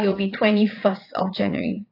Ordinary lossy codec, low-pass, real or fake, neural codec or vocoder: AAC, 32 kbps; 5.4 kHz; fake; vocoder, 22.05 kHz, 80 mel bands, Vocos